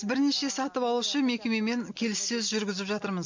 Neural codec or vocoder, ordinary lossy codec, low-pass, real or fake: none; MP3, 64 kbps; 7.2 kHz; real